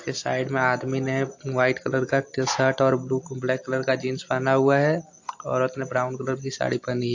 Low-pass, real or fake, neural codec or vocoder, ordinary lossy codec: 7.2 kHz; real; none; AAC, 48 kbps